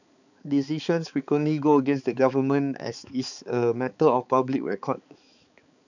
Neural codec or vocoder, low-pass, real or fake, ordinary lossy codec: codec, 16 kHz, 4 kbps, X-Codec, HuBERT features, trained on balanced general audio; 7.2 kHz; fake; none